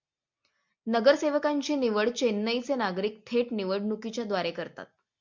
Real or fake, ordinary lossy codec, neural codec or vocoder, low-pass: real; MP3, 64 kbps; none; 7.2 kHz